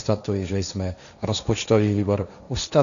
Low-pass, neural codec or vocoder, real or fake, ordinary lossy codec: 7.2 kHz; codec, 16 kHz, 1.1 kbps, Voila-Tokenizer; fake; AAC, 96 kbps